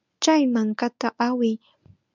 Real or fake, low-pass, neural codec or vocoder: fake; 7.2 kHz; codec, 24 kHz, 0.9 kbps, WavTokenizer, medium speech release version 1